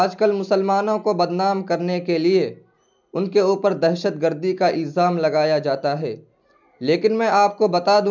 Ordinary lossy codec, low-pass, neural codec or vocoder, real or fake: none; 7.2 kHz; none; real